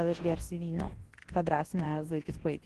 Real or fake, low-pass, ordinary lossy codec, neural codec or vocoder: fake; 10.8 kHz; Opus, 16 kbps; codec, 24 kHz, 0.9 kbps, WavTokenizer, large speech release